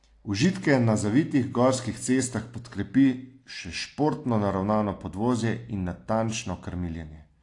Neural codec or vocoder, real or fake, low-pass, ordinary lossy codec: none; real; 10.8 kHz; AAC, 48 kbps